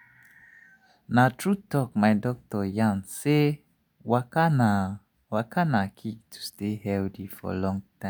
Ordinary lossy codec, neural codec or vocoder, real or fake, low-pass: none; none; real; none